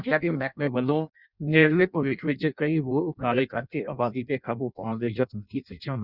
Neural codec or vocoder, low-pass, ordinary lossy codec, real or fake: codec, 16 kHz in and 24 kHz out, 0.6 kbps, FireRedTTS-2 codec; 5.4 kHz; none; fake